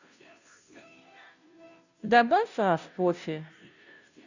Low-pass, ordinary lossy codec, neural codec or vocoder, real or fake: 7.2 kHz; none; codec, 16 kHz, 0.5 kbps, FunCodec, trained on Chinese and English, 25 frames a second; fake